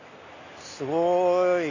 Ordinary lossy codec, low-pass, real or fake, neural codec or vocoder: none; 7.2 kHz; real; none